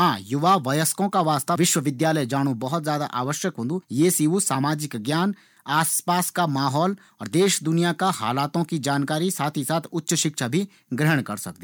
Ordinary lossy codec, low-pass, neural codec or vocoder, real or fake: none; none; none; real